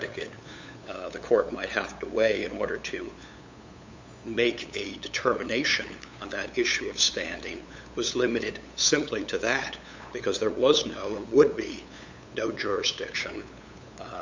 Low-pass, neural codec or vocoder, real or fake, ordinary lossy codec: 7.2 kHz; codec, 16 kHz, 8 kbps, FunCodec, trained on LibriTTS, 25 frames a second; fake; MP3, 64 kbps